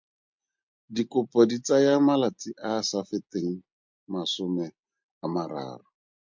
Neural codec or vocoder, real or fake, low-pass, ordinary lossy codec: none; real; 7.2 kHz; MP3, 64 kbps